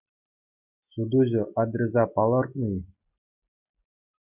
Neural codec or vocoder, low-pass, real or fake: none; 3.6 kHz; real